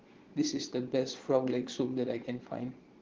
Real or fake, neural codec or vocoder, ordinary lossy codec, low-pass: fake; vocoder, 22.05 kHz, 80 mel bands, Vocos; Opus, 16 kbps; 7.2 kHz